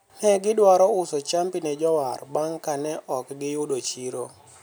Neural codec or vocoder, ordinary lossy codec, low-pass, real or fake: none; none; none; real